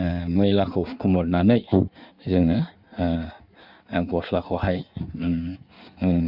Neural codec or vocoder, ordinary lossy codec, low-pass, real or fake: codec, 16 kHz in and 24 kHz out, 1.1 kbps, FireRedTTS-2 codec; Opus, 64 kbps; 5.4 kHz; fake